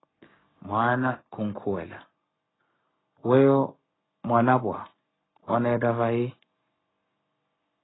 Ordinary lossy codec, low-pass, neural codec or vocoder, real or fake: AAC, 16 kbps; 7.2 kHz; codec, 44.1 kHz, 7.8 kbps, Pupu-Codec; fake